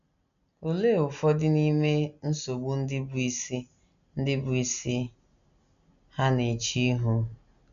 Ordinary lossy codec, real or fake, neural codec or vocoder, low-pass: none; real; none; 7.2 kHz